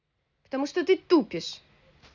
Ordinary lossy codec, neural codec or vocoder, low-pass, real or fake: none; none; 7.2 kHz; real